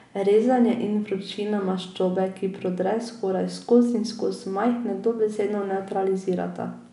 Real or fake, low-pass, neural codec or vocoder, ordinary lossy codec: real; 10.8 kHz; none; none